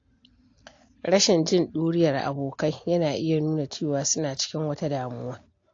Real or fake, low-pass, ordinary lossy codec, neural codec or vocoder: real; 7.2 kHz; AAC, 48 kbps; none